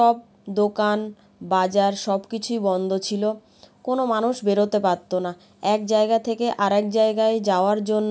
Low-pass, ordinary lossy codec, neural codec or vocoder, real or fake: none; none; none; real